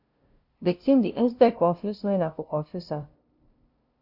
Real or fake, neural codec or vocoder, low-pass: fake; codec, 16 kHz, 0.5 kbps, FunCodec, trained on LibriTTS, 25 frames a second; 5.4 kHz